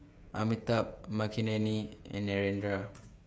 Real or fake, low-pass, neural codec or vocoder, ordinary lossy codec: fake; none; codec, 16 kHz, 16 kbps, FreqCodec, smaller model; none